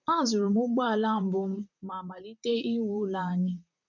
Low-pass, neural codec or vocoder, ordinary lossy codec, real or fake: 7.2 kHz; vocoder, 44.1 kHz, 128 mel bands, Pupu-Vocoder; none; fake